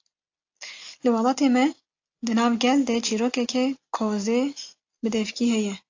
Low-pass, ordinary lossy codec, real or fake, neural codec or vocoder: 7.2 kHz; AAC, 48 kbps; real; none